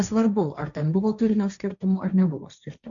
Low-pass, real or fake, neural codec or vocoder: 7.2 kHz; fake; codec, 16 kHz, 1.1 kbps, Voila-Tokenizer